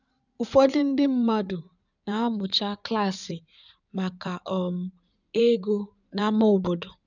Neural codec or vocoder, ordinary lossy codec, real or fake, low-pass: codec, 16 kHz in and 24 kHz out, 2.2 kbps, FireRedTTS-2 codec; none; fake; 7.2 kHz